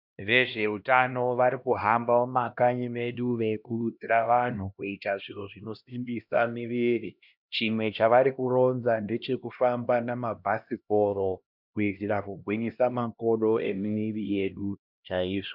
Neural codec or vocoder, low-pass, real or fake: codec, 16 kHz, 1 kbps, X-Codec, HuBERT features, trained on LibriSpeech; 5.4 kHz; fake